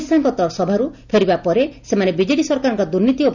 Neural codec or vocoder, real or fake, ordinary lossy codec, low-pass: none; real; none; 7.2 kHz